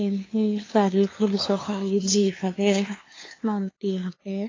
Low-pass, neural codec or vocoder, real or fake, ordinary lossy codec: 7.2 kHz; codec, 16 kHz, 2 kbps, FunCodec, trained on LibriTTS, 25 frames a second; fake; AAC, 32 kbps